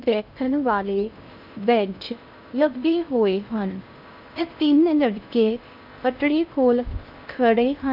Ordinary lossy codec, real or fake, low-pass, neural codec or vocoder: none; fake; 5.4 kHz; codec, 16 kHz in and 24 kHz out, 0.6 kbps, FocalCodec, streaming, 2048 codes